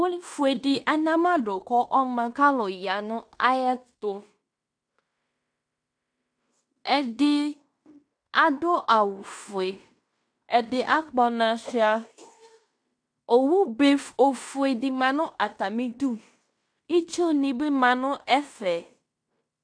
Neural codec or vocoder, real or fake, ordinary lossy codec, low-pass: codec, 16 kHz in and 24 kHz out, 0.9 kbps, LongCat-Audio-Codec, fine tuned four codebook decoder; fake; AAC, 64 kbps; 9.9 kHz